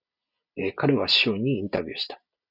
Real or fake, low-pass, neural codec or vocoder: real; 5.4 kHz; none